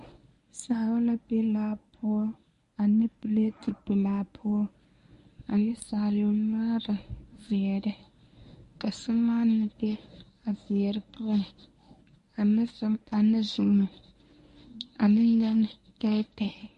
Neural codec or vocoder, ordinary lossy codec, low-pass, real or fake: codec, 24 kHz, 0.9 kbps, WavTokenizer, medium speech release version 1; MP3, 48 kbps; 10.8 kHz; fake